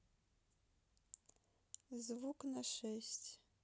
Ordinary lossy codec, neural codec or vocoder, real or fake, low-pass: none; none; real; none